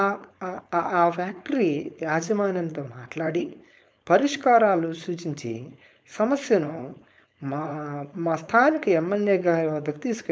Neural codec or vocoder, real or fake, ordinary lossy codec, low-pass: codec, 16 kHz, 4.8 kbps, FACodec; fake; none; none